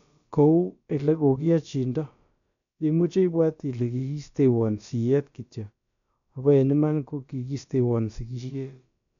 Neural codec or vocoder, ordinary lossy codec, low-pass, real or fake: codec, 16 kHz, about 1 kbps, DyCAST, with the encoder's durations; none; 7.2 kHz; fake